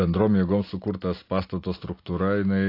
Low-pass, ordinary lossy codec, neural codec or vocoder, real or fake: 5.4 kHz; AAC, 32 kbps; none; real